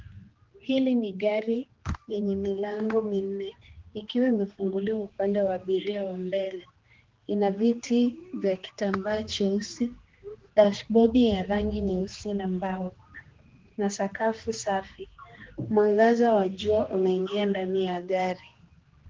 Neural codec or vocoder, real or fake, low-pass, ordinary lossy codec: codec, 16 kHz, 2 kbps, X-Codec, HuBERT features, trained on general audio; fake; 7.2 kHz; Opus, 16 kbps